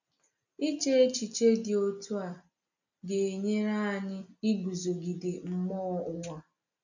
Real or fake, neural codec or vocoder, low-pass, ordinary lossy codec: real; none; 7.2 kHz; none